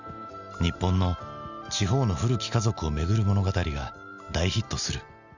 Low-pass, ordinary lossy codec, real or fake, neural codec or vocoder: 7.2 kHz; none; real; none